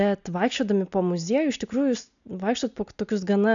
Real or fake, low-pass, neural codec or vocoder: real; 7.2 kHz; none